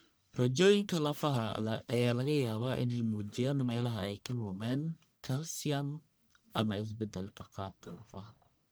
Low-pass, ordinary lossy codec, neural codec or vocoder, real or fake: none; none; codec, 44.1 kHz, 1.7 kbps, Pupu-Codec; fake